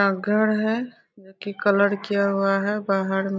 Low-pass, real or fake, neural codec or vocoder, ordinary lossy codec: none; real; none; none